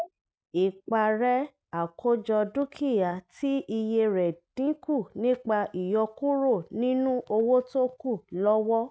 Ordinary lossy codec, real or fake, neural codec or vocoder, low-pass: none; real; none; none